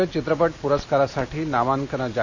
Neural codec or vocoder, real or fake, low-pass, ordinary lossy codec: none; real; 7.2 kHz; AAC, 48 kbps